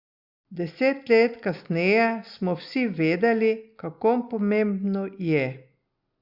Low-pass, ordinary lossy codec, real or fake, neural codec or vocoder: 5.4 kHz; Opus, 64 kbps; real; none